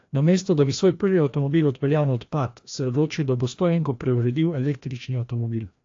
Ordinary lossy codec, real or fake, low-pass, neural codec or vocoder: AAC, 48 kbps; fake; 7.2 kHz; codec, 16 kHz, 1 kbps, FreqCodec, larger model